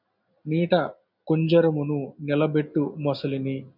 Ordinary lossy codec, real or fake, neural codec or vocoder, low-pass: MP3, 48 kbps; real; none; 5.4 kHz